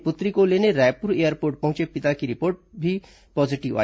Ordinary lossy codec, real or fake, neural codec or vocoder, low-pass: none; real; none; none